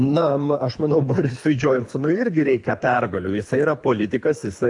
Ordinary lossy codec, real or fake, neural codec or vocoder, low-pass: AAC, 64 kbps; fake; codec, 24 kHz, 3 kbps, HILCodec; 10.8 kHz